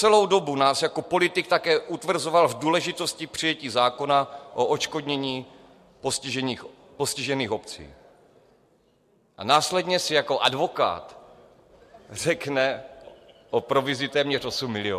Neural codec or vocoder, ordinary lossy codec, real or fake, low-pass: none; MP3, 64 kbps; real; 14.4 kHz